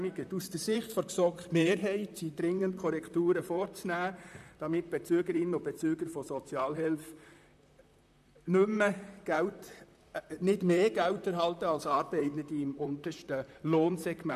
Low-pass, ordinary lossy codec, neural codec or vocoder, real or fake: 14.4 kHz; none; vocoder, 44.1 kHz, 128 mel bands, Pupu-Vocoder; fake